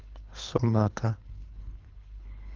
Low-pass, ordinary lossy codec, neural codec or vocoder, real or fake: 7.2 kHz; Opus, 24 kbps; codec, 24 kHz, 3 kbps, HILCodec; fake